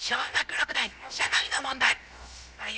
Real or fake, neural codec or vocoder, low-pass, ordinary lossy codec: fake; codec, 16 kHz, about 1 kbps, DyCAST, with the encoder's durations; none; none